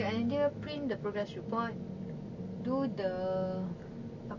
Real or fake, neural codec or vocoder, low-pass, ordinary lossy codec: real; none; 7.2 kHz; none